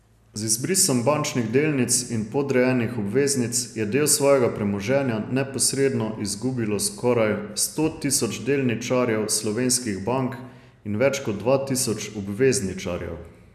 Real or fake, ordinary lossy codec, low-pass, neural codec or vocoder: real; none; 14.4 kHz; none